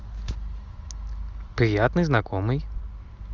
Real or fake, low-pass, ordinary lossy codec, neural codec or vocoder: real; 7.2 kHz; Opus, 32 kbps; none